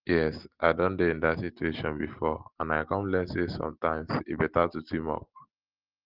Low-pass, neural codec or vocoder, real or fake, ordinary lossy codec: 5.4 kHz; none; real; Opus, 16 kbps